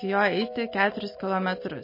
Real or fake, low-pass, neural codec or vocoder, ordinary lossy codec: real; 5.4 kHz; none; MP3, 24 kbps